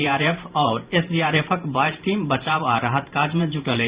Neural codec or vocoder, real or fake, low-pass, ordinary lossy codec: vocoder, 44.1 kHz, 128 mel bands every 512 samples, BigVGAN v2; fake; 3.6 kHz; Opus, 64 kbps